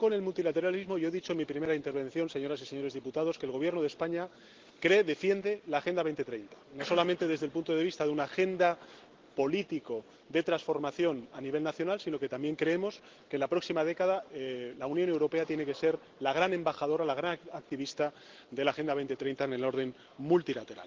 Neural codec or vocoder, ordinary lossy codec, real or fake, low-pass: none; Opus, 16 kbps; real; 7.2 kHz